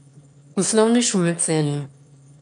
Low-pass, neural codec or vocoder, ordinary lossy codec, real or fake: 9.9 kHz; autoencoder, 22.05 kHz, a latent of 192 numbers a frame, VITS, trained on one speaker; none; fake